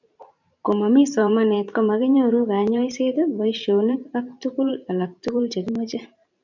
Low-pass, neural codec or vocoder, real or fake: 7.2 kHz; vocoder, 44.1 kHz, 128 mel bands every 512 samples, BigVGAN v2; fake